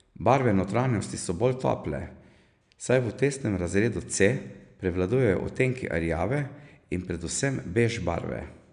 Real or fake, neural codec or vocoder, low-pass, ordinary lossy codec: real; none; 9.9 kHz; none